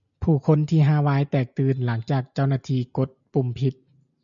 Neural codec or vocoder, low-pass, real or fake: none; 7.2 kHz; real